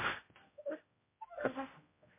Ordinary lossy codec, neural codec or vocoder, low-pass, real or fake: MP3, 24 kbps; codec, 16 kHz, 0.5 kbps, X-Codec, HuBERT features, trained on general audio; 3.6 kHz; fake